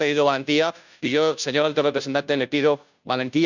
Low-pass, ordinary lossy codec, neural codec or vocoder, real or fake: 7.2 kHz; none; codec, 16 kHz, 0.5 kbps, FunCodec, trained on Chinese and English, 25 frames a second; fake